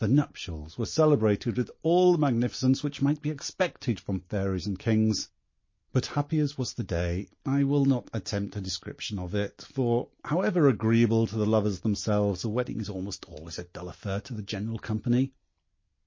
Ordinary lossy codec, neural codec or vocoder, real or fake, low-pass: MP3, 32 kbps; none; real; 7.2 kHz